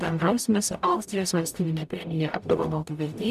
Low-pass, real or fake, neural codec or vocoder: 14.4 kHz; fake; codec, 44.1 kHz, 0.9 kbps, DAC